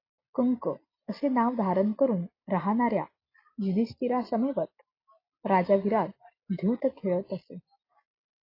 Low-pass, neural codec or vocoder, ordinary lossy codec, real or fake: 5.4 kHz; none; AAC, 32 kbps; real